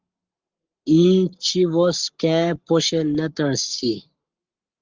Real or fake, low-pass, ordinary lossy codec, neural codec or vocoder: fake; 7.2 kHz; Opus, 32 kbps; codec, 44.1 kHz, 7.8 kbps, Pupu-Codec